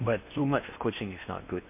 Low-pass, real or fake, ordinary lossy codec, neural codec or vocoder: 3.6 kHz; fake; MP3, 24 kbps; codec, 16 kHz in and 24 kHz out, 0.8 kbps, FocalCodec, streaming, 65536 codes